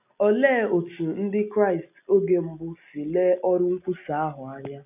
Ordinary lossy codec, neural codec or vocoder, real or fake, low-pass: none; none; real; 3.6 kHz